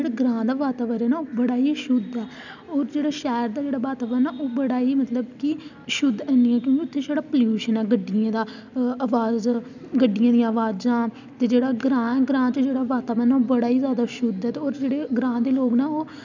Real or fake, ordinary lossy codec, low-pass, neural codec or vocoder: real; none; 7.2 kHz; none